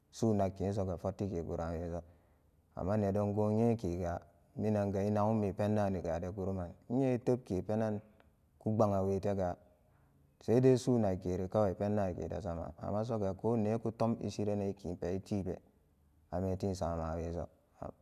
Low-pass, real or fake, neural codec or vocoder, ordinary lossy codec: 14.4 kHz; real; none; none